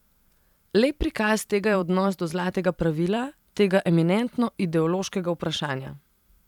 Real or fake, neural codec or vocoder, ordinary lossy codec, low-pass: fake; vocoder, 44.1 kHz, 128 mel bands every 512 samples, BigVGAN v2; none; 19.8 kHz